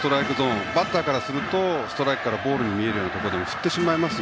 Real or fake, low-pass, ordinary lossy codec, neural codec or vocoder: real; none; none; none